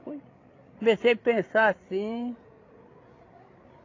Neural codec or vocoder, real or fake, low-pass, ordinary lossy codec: codec, 16 kHz, 16 kbps, FreqCodec, larger model; fake; 7.2 kHz; AAC, 32 kbps